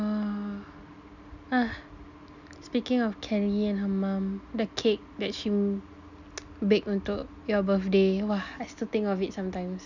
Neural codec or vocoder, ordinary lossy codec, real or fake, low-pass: none; none; real; 7.2 kHz